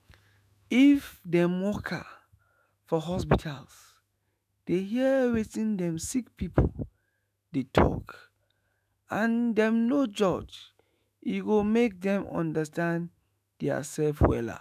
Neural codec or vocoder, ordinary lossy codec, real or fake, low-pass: autoencoder, 48 kHz, 128 numbers a frame, DAC-VAE, trained on Japanese speech; none; fake; 14.4 kHz